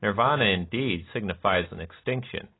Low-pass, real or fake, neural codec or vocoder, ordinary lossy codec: 7.2 kHz; real; none; AAC, 16 kbps